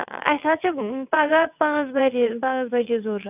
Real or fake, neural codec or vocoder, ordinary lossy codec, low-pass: fake; vocoder, 22.05 kHz, 80 mel bands, WaveNeXt; none; 3.6 kHz